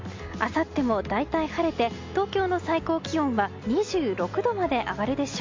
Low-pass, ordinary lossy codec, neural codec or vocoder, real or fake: 7.2 kHz; MP3, 48 kbps; none; real